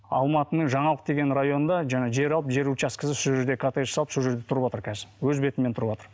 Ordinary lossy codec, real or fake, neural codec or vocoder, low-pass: none; real; none; none